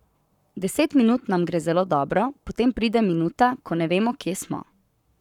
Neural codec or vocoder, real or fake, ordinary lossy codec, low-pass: codec, 44.1 kHz, 7.8 kbps, Pupu-Codec; fake; none; 19.8 kHz